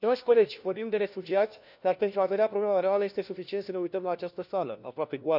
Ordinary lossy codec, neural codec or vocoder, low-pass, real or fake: none; codec, 16 kHz, 1 kbps, FunCodec, trained on LibriTTS, 50 frames a second; 5.4 kHz; fake